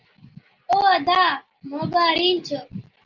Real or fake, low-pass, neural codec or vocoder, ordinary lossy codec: real; 7.2 kHz; none; Opus, 24 kbps